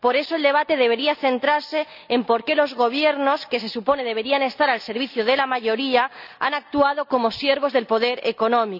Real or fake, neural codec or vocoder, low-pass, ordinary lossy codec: real; none; 5.4 kHz; none